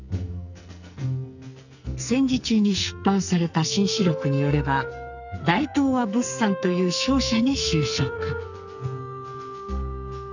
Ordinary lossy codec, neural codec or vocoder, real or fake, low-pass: none; codec, 44.1 kHz, 2.6 kbps, SNAC; fake; 7.2 kHz